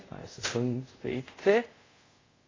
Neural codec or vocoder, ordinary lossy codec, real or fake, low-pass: codec, 24 kHz, 0.5 kbps, DualCodec; AAC, 32 kbps; fake; 7.2 kHz